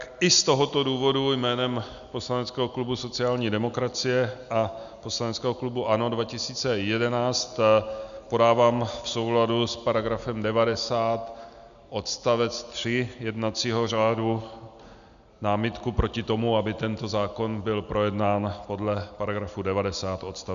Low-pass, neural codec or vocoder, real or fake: 7.2 kHz; none; real